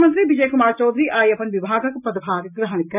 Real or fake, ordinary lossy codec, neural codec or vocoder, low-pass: real; none; none; 3.6 kHz